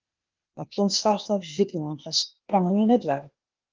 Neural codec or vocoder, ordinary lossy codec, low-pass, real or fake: codec, 16 kHz, 0.8 kbps, ZipCodec; Opus, 24 kbps; 7.2 kHz; fake